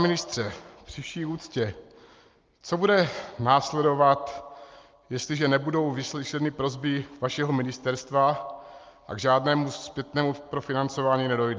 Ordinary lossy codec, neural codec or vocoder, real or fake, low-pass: Opus, 24 kbps; none; real; 7.2 kHz